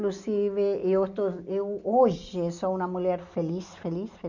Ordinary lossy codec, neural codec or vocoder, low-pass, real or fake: none; none; 7.2 kHz; real